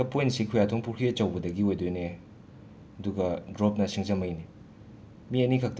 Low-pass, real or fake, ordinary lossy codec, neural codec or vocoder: none; real; none; none